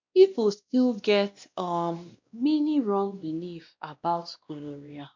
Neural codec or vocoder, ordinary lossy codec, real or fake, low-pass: codec, 16 kHz, 1 kbps, X-Codec, WavLM features, trained on Multilingual LibriSpeech; MP3, 64 kbps; fake; 7.2 kHz